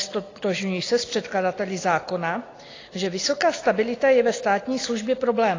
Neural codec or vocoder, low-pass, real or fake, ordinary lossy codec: none; 7.2 kHz; real; AAC, 32 kbps